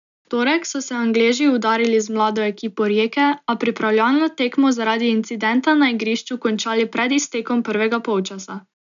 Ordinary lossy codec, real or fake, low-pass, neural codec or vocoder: none; real; 7.2 kHz; none